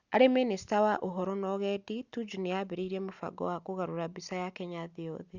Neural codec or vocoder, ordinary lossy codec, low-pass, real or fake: none; Opus, 64 kbps; 7.2 kHz; real